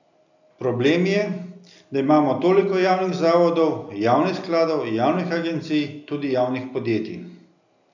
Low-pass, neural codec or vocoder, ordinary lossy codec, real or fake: 7.2 kHz; none; none; real